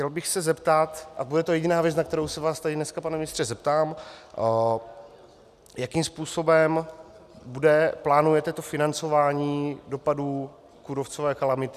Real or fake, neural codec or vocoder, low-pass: real; none; 14.4 kHz